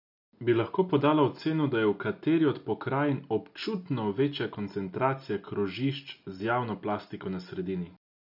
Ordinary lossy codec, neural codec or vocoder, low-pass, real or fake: none; none; 5.4 kHz; real